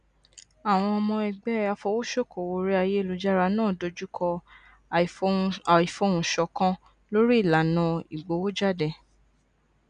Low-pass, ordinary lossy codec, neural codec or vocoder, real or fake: 9.9 kHz; none; none; real